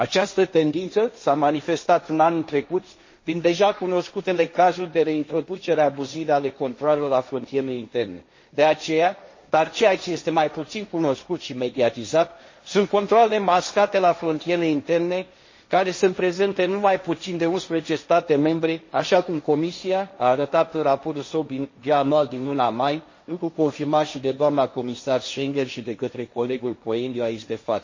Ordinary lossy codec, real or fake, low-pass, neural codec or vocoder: MP3, 32 kbps; fake; 7.2 kHz; codec, 16 kHz, 1.1 kbps, Voila-Tokenizer